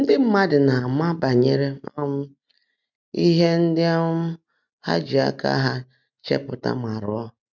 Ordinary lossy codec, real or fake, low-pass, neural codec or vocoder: none; real; 7.2 kHz; none